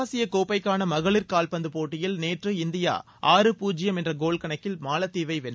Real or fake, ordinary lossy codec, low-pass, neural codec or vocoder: real; none; none; none